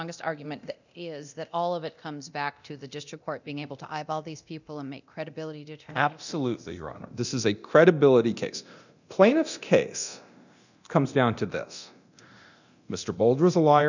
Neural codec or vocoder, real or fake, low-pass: codec, 24 kHz, 0.9 kbps, DualCodec; fake; 7.2 kHz